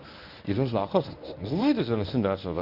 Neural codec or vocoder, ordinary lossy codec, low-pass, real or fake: codec, 24 kHz, 0.9 kbps, WavTokenizer, medium speech release version 1; none; 5.4 kHz; fake